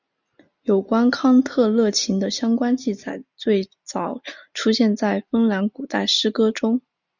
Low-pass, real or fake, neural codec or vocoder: 7.2 kHz; real; none